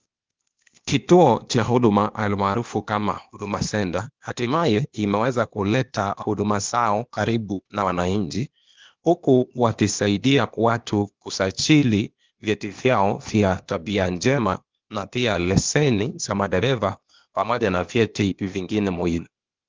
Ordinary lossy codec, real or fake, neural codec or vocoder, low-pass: Opus, 32 kbps; fake; codec, 16 kHz, 0.8 kbps, ZipCodec; 7.2 kHz